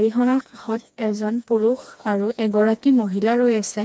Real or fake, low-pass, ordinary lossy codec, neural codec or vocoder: fake; none; none; codec, 16 kHz, 2 kbps, FreqCodec, smaller model